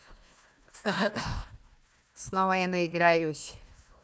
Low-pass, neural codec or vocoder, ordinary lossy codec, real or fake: none; codec, 16 kHz, 1 kbps, FunCodec, trained on Chinese and English, 50 frames a second; none; fake